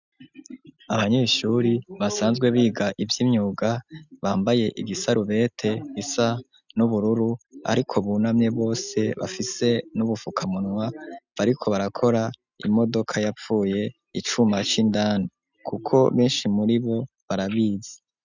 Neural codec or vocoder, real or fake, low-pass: none; real; 7.2 kHz